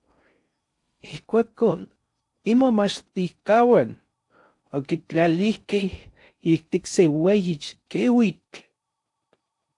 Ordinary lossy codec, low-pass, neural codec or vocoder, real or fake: AAC, 64 kbps; 10.8 kHz; codec, 16 kHz in and 24 kHz out, 0.6 kbps, FocalCodec, streaming, 4096 codes; fake